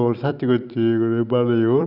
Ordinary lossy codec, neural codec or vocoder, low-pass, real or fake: none; vocoder, 44.1 kHz, 128 mel bands every 256 samples, BigVGAN v2; 5.4 kHz; fake